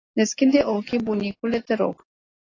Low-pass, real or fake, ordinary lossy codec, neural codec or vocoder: 7.2 kHz; real; AAC, 32 kbps; none